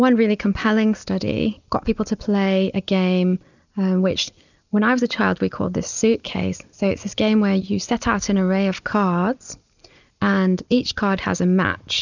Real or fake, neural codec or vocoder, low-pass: real; none; 7.2 kHz